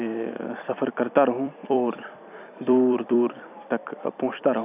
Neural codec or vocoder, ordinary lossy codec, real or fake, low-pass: vocoder, 44.1 kHz, 128 mel bands every 256 samples, BigVGAN v2; none; fake; 3.6 kHz